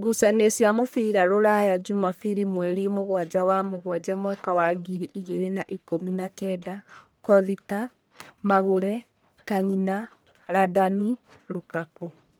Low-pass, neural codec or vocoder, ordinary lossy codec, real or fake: none; codec, 44.1 kHz, 1.7 kbps, Pupu-Codec; none; fake